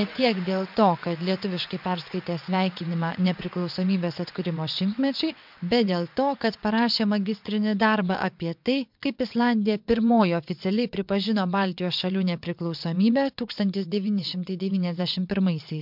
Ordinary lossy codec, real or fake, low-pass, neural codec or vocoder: MP3, 48 kbps; fake; 5.4 kHz; vocoder, 22.05 kHz, 80 mel bands, WaveNeXt